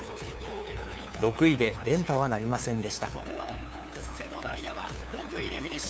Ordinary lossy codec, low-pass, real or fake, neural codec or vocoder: none; none; fake; codec, 16 kHz, 2 kbps, FunCodec, trained on LibriTTS, 25 frames a second